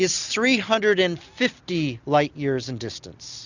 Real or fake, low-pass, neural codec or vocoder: real; 7.2 kHz; none